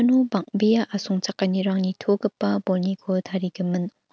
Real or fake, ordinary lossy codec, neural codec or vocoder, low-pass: real; none; none; none